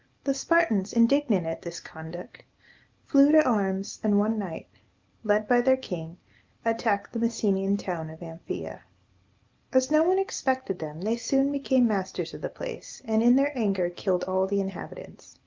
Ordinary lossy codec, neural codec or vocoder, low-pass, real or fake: Opus, 32 kbps; none; 7.2 kHz; real